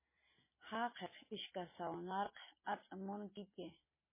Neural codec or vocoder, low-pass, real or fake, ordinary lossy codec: none; 3.6 kHz; real; MP3, 16 kbps